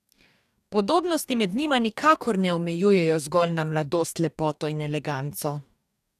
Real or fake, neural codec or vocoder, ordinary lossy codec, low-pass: fake; codec, 44.1 kHz, 2.6 kbps, DAC; none; 14.4 kHz